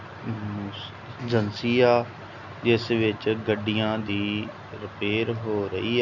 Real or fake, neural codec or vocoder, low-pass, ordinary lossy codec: real; none; 7.2 kHz; none